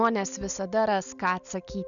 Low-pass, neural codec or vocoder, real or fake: 7.2 kHz; none; real